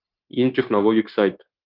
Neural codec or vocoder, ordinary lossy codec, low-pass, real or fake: codec, 16 kHz, 0.9 kbps, LongCat-Audio-Codec; Opus, 24 kbps; 5.4 kHz; fake